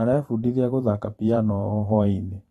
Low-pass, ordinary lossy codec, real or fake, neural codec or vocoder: 19.8 kHz; AAC, 32 kbps; fake; vocoder, 44.1 kHz, 128 mel bands every 512 samples, BigVGAN v2